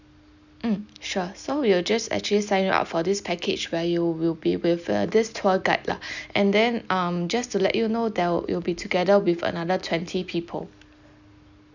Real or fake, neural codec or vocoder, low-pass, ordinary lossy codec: real; none; 7.2 kHz; none